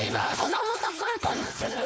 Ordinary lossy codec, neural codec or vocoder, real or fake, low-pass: none; codec, 16 kHz, 4.8 kbps, FACodec; fake; none